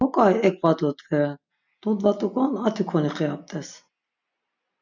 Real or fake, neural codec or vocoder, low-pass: real; none; 7.2 kHz